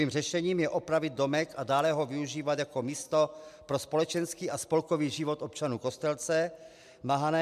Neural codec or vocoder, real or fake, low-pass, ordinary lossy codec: none; real; 14.4 kHz; MP3, 96 kbps